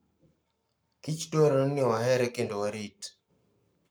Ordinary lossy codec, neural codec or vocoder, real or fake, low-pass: none; codec, 44.1 kHz, 7.8 kbps, Pupu-Codec; fake; none